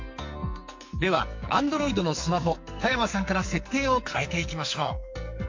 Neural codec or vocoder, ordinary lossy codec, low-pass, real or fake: codec, 44.1 kHz, 2.6 kbps, SNAC; MP3, 48 kbps; 7.2 kHz; fake